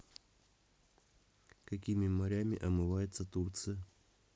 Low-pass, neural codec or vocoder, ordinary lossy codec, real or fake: none; none; none; real